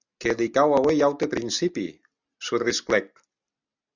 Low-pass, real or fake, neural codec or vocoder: 7.2 kHz; real; none